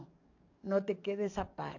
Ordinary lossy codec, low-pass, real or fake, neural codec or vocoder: AAC, 48 kbps; 7.2 kHz; fake; codec, 44.1 kHz, 7.8 kbps, DAC